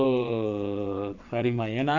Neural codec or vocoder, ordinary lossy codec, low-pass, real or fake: vocoder, 22.05 kHz, 80 mel bands, WaveNeXt; none; 7.2 kHz; fake